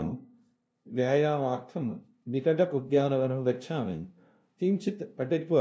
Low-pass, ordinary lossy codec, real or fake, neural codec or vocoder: none; none; fake; codec, 16 kHz, 0.5 kbps, FunCodec, trained on LibriTTS, 25 frames a second